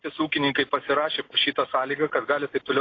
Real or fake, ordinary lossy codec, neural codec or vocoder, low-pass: real; AAC, 32 kbps; none; 7.2 kHz